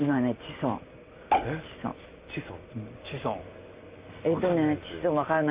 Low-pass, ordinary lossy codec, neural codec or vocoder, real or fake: 3.6 kHz; Opus, 64 kbps; none; real